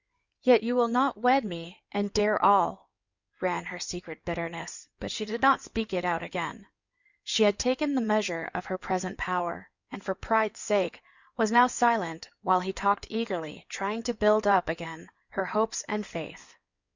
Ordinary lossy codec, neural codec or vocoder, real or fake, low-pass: Opus, 64 kbps; codec, 16 kHz in and 24 kHz out, 2.2 kbps, FireRedTTS-2 codec; fake; 7.2 kHz